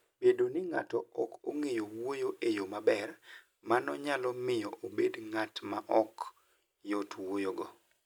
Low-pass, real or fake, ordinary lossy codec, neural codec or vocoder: none; real; none; none